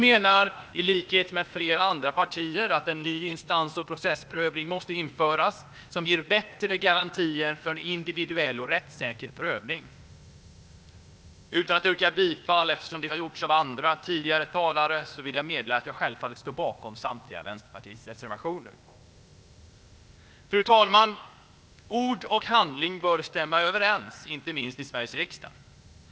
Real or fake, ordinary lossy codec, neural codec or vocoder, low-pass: fake; none; codec, 16 kHz, 0.8 kbps, ZipCodec; none